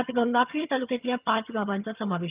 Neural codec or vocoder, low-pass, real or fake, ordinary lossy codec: vocoder, 22.05 kHz, 80 mel bands, HiFi-GAN; 3.6 kHz; fake; Opus, 24 kbps